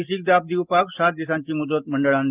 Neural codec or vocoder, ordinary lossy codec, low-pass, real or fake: none; Opus, 32 kbps; 3.6 kHz; real